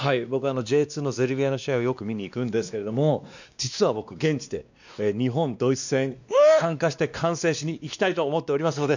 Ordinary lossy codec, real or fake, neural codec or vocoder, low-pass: none; fake; codec, 16 kHz, 2 kbps, X-Codec, WavLM features, trained on Multilingual LibriSpeech; 7.2 kHz